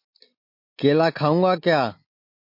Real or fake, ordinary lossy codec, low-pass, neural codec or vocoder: real; MP3, 32 kbps; 5.4 kHz; none